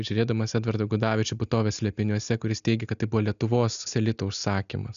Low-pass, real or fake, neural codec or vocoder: 7.2 kHz; real; none